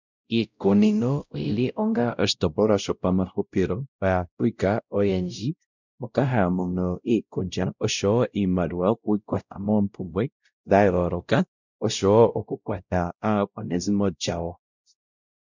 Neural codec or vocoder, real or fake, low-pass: codec, 16 kHz, 0.5 kbps, X-Codec, WavLM features, trained on Multilingual LibriSpeech; fake; 7.2 kHz